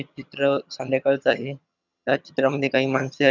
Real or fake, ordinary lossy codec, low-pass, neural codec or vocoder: fake; none; 7.2 kHz; vocoder, 22.05 kHz, 80 mel bands, HiFi-GAN